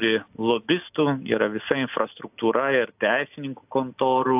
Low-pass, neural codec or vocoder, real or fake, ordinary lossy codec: 3.6 kHz; none; real; AAC, 32 kbps